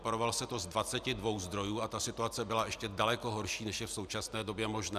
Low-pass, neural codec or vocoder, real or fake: 14.4 kHz; none; real